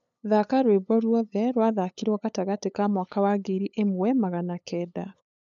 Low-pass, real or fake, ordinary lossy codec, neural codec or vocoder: 7.2 kHz; fake; none; codec, 16 kHz, 8 kbps, FunCodec, trained on LibriTTS, 25 frames a second